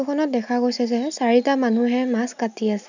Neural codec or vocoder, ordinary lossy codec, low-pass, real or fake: none; none; 7.2 kHz; real